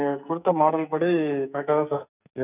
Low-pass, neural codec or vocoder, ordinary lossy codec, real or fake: 3.6 kHz; codec, 44.1 kHz, 2.6 kbps, SNAC; none; fake